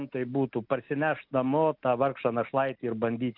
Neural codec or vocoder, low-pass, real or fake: none; 5.4 kHz; real